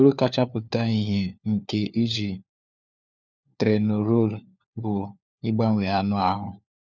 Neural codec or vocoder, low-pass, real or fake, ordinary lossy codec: codec, 16 kHz, 4 kbps, FunCodec, trained on LibriTTS, 50 frames a second; none; fake; none